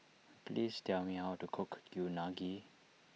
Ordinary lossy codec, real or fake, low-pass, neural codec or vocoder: none; real; none; none